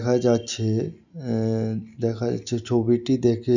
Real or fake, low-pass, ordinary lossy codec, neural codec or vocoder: real; 7.2 kHz; none; none